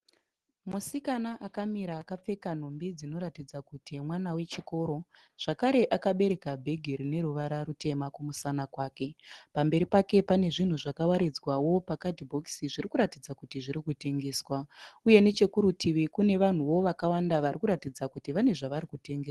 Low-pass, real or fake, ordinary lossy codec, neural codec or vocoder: 10.8 kHz; real; Opus, 16 kbps; none